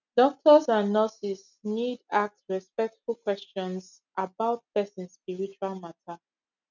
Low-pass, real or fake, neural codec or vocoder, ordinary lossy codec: 7.2 kHz; real; none; none